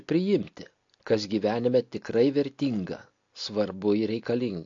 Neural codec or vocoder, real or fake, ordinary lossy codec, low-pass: none; real; AAC, 48 kbps; 7.2 kHz